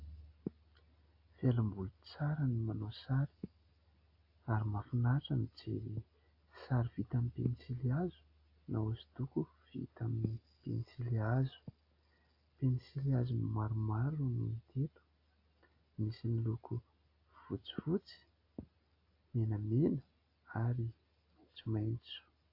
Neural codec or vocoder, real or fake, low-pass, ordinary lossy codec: none; real; 5.4 kHz; AAC, 32 kbps